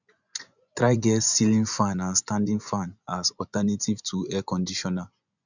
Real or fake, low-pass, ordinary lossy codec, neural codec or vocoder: fake; 7.2 kHz; none; vocoder, 24 kHz, 100 mel bands, Vocos